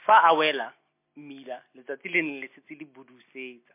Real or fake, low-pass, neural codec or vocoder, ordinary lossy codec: real; 3.6 kHz; none; MP3, 24 kbps